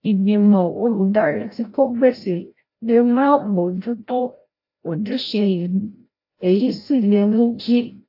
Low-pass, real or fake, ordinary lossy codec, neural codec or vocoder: 5.4 kHz; fake; AAC, 32 kbps; codec, 16 kHz, 0.5 kbps, FreqCodec, larger model